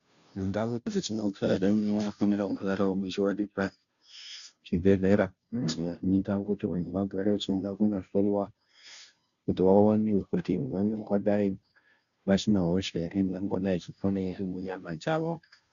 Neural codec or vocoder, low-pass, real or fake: codec, 16 kHz, 0.5 kbps, FunCodec, trained on Chinese and English, 25 frames a second; 7.2 kHz; fake